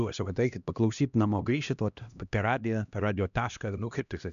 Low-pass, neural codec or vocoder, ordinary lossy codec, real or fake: 7.2 kHz; codec, 16 kHz, 1 kbps, X-Codec, HuBERT features, trained on LibriSpeech; MP3, 96 kbps; fake